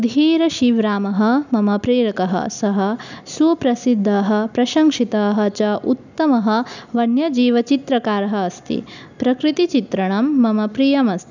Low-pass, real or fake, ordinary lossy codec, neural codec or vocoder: 7.2 kHz; real; none; none